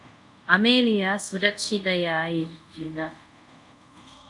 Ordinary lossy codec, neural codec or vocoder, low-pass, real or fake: MP3, 96 kbps; codec, 24 kHz, 0.5 kbps, DualCodec; 10.8 kHz; fake